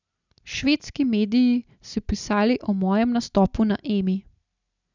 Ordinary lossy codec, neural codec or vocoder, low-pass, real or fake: none; none; 7.2 kHz; real